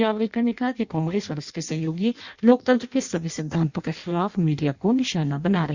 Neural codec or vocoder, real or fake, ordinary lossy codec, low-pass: codec, 16 kHz in and 24 kHz out, 0.6 kbps, FireRedTTS-2 codec; fake; Opus, 64 kbps; 7.2 kHz